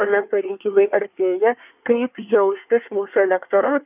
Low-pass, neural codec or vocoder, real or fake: 3.6 kHz; codec, 24 kHz, 1 kbps, SNAC; fake